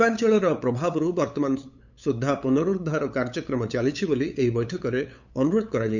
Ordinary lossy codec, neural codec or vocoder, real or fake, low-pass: none; codec, 16 kHz, 8 kbps, FunCodec, trained on LibriTTS, 25 frames a second; fake; 7.2 kHz